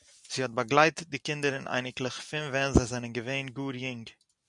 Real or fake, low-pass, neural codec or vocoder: real; 10.8 kHz; none